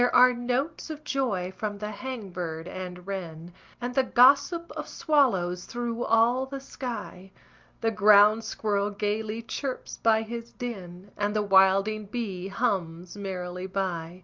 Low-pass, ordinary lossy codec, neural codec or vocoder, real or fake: 7.2 kHz; Opus, 24 kbps; none; real